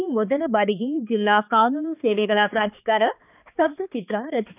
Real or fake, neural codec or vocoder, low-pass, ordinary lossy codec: fake; codec, 16 kHz, 2 kbps, X-Codec, HuBERT features, trained on balanced general audio; 3.6 kHz; none